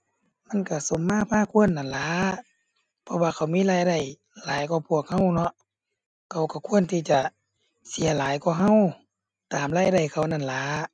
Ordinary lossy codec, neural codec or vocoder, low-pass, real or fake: none; none; 9.9 kHz; real